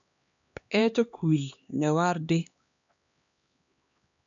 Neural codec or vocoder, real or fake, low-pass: codec, 16 kHz, 2 kbps, X-Codec, HuBERT features, trained on LibriSpeech; fake; 7.2 kHz